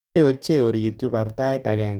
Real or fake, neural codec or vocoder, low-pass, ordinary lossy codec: fake; codec, 44.1 kHz, 2.6 kbps, DAC; 19.8 kHz; none